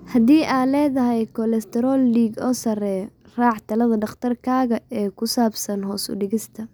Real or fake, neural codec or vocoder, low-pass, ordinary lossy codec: real; none; none; none